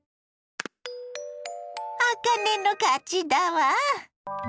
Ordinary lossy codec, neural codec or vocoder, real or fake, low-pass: none; none; real; none